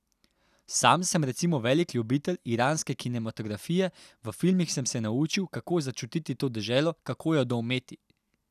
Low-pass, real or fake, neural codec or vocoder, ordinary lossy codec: 14.4 kHz; real; none; none